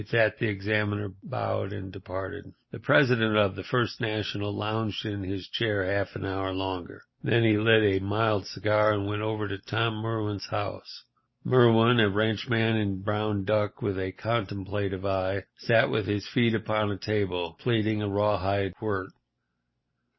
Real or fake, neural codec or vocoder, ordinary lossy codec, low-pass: real; none; MP3, 24 kbps; 7.2 kHz